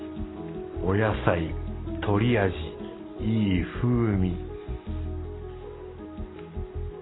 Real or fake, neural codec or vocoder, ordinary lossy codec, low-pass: real; none; AAC, 16 kbps; 7.2 kHz